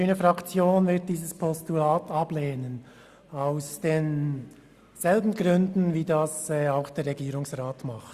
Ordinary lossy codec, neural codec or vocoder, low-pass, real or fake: Opus, 64 kbps; none; 14.4 kHz; real